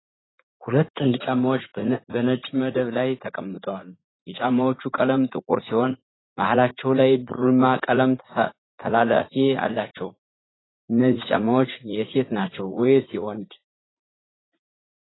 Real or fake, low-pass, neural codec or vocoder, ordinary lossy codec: fake; 7.2 kHz; vocoder, 44.1 kHz, 80 mel bands, Vocos; AAC, 16 kbps